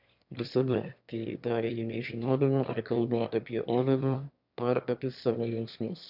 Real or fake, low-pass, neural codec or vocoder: fake; 5.4 kHz; autoencoder, 22.05 kHz, a latent of 192 numbers a frame, VITS, trained on one speaker